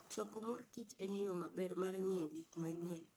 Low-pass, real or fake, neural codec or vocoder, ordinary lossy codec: none; fake; codec, 44.1 kHz, 1.7 kbps, Pupu-Codec; none